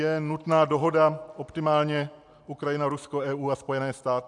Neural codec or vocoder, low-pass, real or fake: none; 10.8 kHz; real